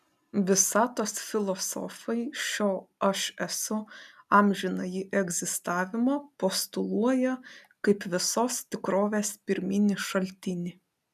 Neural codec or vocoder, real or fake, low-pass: none; real; 14.4 kHz